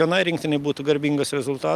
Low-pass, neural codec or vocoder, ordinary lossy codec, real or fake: 14.4 kHz; none; Opus, 32 kbps; real